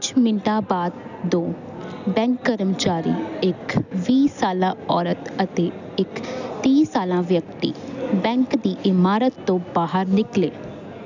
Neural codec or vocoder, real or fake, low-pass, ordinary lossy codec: none; real; 7.2 kHz; none